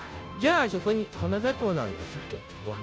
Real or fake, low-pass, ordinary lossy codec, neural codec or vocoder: fake; none; none; codec, 16 kHz, 0.5 kbps, FunCodec, trained on Chinese and English, 25 frames a second